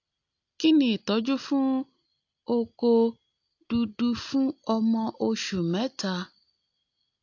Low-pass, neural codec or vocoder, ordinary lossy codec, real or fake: 7.2 kHz; none; AAC, 48 kbps; real